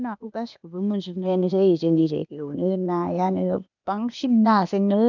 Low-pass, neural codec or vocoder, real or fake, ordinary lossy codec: 7.2 kHz; codec, 16 kHz, 0.8 kbps, ZipCodec; fake; none